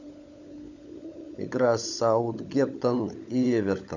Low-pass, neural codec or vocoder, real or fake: 7.2 kHz; codec, 16 kHz, 16 kbps, FunCodec, trained on LibriTTS, 50 frames a second; fake